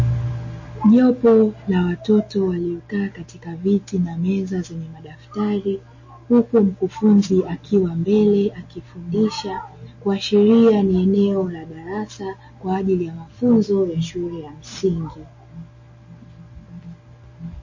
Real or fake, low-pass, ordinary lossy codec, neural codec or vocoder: real; 7.2 kHz; MP3, 32 kbps; none